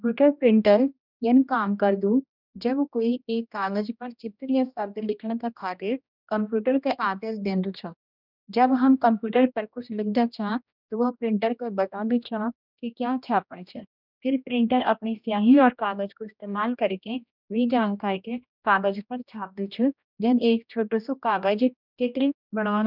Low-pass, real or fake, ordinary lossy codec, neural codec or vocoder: 5.4 kHz; fake; none; codec, 16 kHz, 1 kbps, X-Codec, HuBERT features, trained on general audio